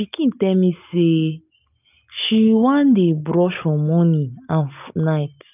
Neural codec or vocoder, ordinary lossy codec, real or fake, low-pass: none; none; real; 3.6 kHz